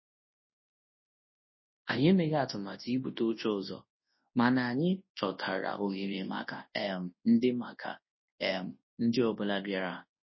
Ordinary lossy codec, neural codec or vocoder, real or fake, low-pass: MP3, 24 kbps; codec, 24 kHz, 0.9 kbps, WavTokenizer, large speech release; fake; 7.2 kHz